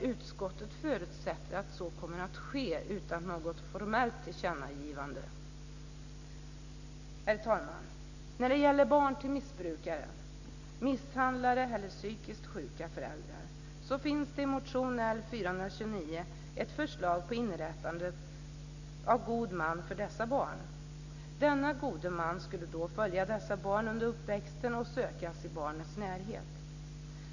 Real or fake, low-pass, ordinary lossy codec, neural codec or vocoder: real; 7.2 kHz; none; none